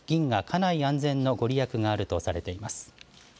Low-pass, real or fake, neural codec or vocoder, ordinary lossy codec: none; real; none; none